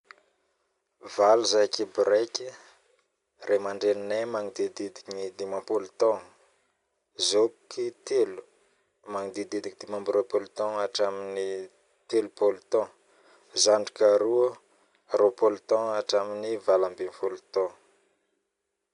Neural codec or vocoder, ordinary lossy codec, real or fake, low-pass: none; none; real; 10.8 kHz